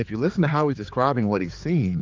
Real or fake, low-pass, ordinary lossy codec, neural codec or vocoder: fake; 7.2 kHz; Opus, 24 kbps; codec, 16 kHz, 4 kbps, X-Codec, HuBERT features, trained on general audio